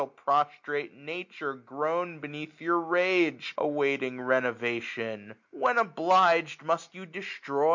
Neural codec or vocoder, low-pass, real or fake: none; 7.2 kHz; real